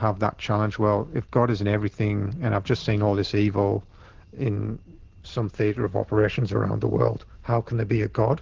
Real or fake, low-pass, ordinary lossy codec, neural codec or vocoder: real; 7.2 kHz; Opus, 16 kbps; none